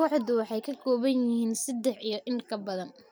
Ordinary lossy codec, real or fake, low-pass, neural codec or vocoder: none; real; none; none